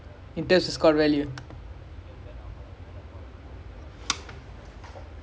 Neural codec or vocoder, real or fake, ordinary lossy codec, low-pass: none; real; none; none